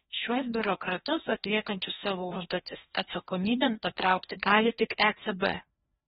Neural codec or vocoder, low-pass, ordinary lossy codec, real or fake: codec, 16 kHz, 1 kbps, FreqCodec, larger model; 7.2 kHz; AAC, 16 kbps; fake